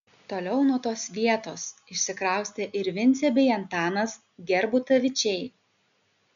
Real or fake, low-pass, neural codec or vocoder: real; 7.2 kHz; none